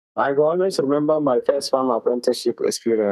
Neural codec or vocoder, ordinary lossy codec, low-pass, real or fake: codec, 32 kHz, 1.9 kbps, SNAC; none; 14.4 kHz; fake